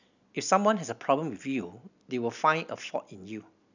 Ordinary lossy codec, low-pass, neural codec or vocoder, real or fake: none; 7.2 kHz; none; real